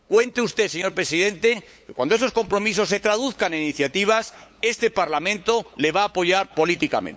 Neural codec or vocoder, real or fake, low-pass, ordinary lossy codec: codec, 16 kHz, 8 kbps, FunCodec, trained on LibriTTS, 25 frames a second; fake; none; none